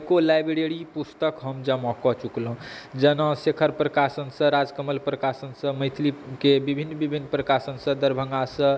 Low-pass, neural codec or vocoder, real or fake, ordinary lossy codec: none; none; real; none